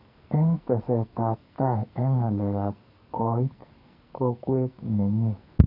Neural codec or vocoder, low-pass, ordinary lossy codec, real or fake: codec, 44.1 kHz, 2.6 kbps, SNAC; 5.4 kHz; none; fake